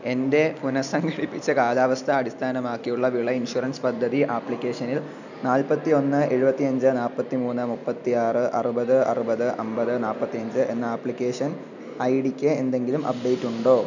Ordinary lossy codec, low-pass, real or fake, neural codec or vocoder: none; 7.2 kHz; real; none